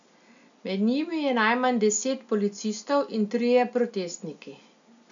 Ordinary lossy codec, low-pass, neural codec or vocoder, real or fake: none; 7.2 kHz; none; real